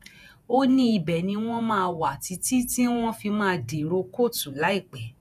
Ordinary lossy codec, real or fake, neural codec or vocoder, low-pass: none; fake; vocoder, 48 kHz, 128 mel bands, Vocos; 14.4 kHz